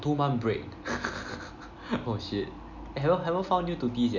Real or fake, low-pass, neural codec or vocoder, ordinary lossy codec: real; 7.2 kHz; none; none